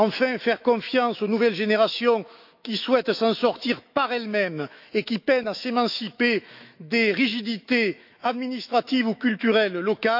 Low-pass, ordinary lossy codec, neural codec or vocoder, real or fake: 5.4 kHz; none; autoencoder, 48 kHz, 128 numbers a frame, DAC-VAE, trained on Japanese speech; fake